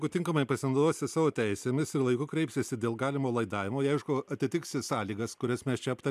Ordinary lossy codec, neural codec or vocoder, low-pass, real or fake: MP3, 96 kbps; none; 14.4 kHz; real